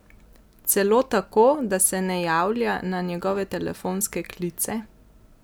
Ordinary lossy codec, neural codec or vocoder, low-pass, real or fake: none; none; none; real